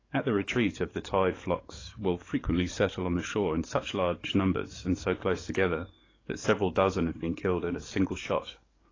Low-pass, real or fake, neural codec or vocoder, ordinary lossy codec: 7.2 kHz; fake; codec, 44.1 kHz, 7.8 kbps, DAC; AAC, 32 kbps